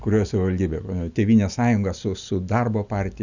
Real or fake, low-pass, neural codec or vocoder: real; 7.2 kHz; none